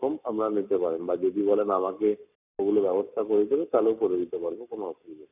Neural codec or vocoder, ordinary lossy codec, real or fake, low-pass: codec, 44.1 kHz, 7.8 kbps, Pupu-Codec; none; fake; 3.6 kHz